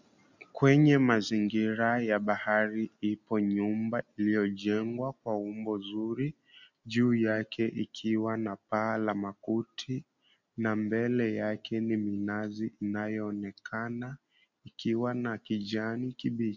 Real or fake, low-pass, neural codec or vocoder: real; 7.2 kHz; none